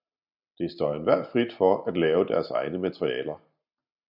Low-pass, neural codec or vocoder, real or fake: 5.4 kHz; none; real